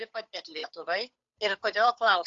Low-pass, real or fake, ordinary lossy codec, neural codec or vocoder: 7.2 kHz; fake; AAC, 64 kbps; codec, 16 kHz, 8 kbps, FunCodec, trained on Chinese and English, 25 frames a second